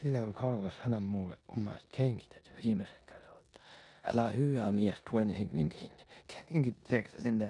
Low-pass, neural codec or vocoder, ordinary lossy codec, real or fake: 10.8 kHz; codec, 16 kHz in and 24 kHz out, 0.9 kbps, LongCat-Audio-Codec, four codebook decoder; none; fake